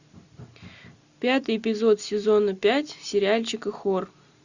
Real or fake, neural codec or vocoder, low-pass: real; none; 7.2 kHz